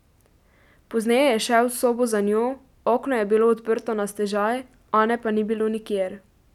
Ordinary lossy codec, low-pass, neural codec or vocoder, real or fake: none; 19.8 kHz; none; real